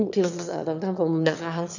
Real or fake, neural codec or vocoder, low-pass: fake; autoencoder, 22.05 kHz, a latent of 192 numbers a frame, VITS, trained on one speaker; 7.2 kHz